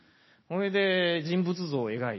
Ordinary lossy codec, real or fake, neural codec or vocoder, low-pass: MP3, 24 kbps; real; none; 7.2 kHz